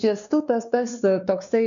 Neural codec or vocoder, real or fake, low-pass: codec, 16 kHz, 2 kbps, X-Codec, WavLM features, trained on Multilingual LibriSpeech; fake; 7.2 kHz